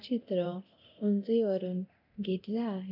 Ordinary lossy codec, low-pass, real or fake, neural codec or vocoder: none; 5.4 kHz; fake; codec, 24 kHz, 0.9 kbps, DualCodec